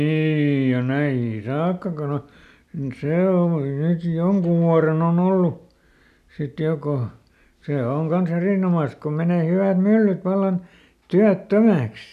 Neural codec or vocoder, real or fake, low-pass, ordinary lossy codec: none; real; 14.4 kHz; none